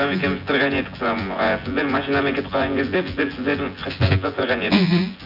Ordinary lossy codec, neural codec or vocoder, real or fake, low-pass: none; vocoder, 24 kHz, 100 mel bands, Vocos; fake; 5.4 kHz